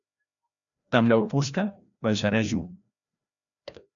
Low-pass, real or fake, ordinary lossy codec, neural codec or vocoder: 7.2 kHz; fake; MP3, 96 kbps; codec, 16 kHz, 1 kbps, FreqCodec, larger model